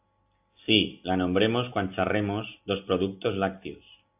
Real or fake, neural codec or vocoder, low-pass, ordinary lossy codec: real; none; 3.6 kHz; AAC, 32 kbps